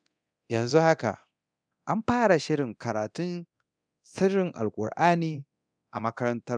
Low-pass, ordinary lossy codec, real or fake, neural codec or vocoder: 9.9 kHz; none; fake; codec, 24 kHz, 0.9 kbps, DualCodec